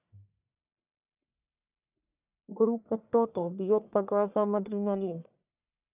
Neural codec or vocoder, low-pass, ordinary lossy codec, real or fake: codec, 44.1 kHz, 1.7 kbps, Pupu-Codec; 3.6 kHz; none; fake